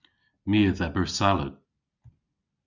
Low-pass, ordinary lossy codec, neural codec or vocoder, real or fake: 7.2 kHz; Opus, 64 kbps; none; real